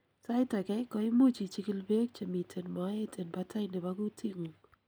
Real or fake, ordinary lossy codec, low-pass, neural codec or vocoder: real; none; none; none